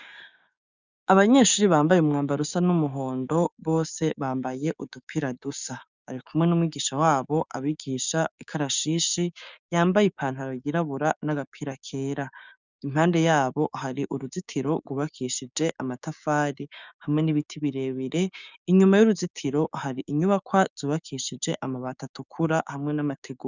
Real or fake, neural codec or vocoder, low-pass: fake; codec, 16 kHz, 6 kbps, DAC; 7.2 kHz